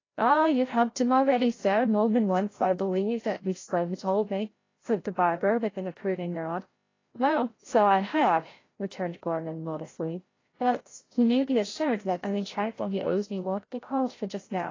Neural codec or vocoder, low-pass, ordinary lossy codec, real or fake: codec, 16 kHz, 0.5 kbps, FreqCodec, larger model; 7.2 kHz; AAC, 32 kbps; fake